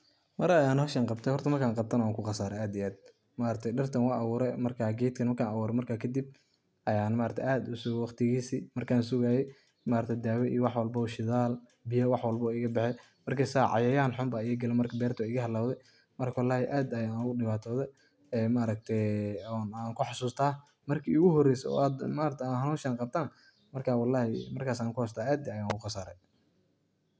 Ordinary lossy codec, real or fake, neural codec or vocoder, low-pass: none; real; none; none